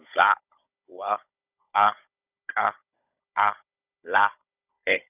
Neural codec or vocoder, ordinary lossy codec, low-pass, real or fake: codec, 16 kHz, 16 kbps, FunCodec, trained on Chinese and English, 50 frames a second; none; 3.6 kHz; fake